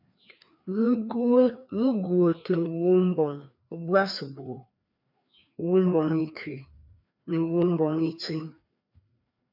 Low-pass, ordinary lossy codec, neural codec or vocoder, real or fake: 5.4 kHz; MP3, 48 kbps; codec, 16 kHz, 2 kbps, FreqCodec, larger model; fake